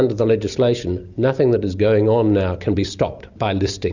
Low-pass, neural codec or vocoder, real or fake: 7.2 kHz; none; real